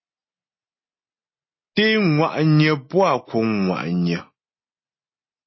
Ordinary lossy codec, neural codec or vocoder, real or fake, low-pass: MP3, 24 kbps; none; real; 7.2 kHz